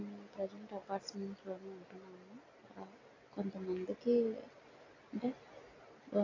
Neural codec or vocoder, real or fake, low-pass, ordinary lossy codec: none; real; 7.2 kHz; AAC, 32 kbps